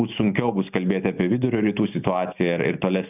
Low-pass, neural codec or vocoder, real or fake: 3.6 kHz; none; real